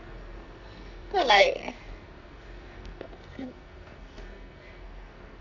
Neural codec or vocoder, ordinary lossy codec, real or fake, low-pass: codec, 44.1 kHz, 2.6 kbps, SNAC; none; fake; 7.2 kHz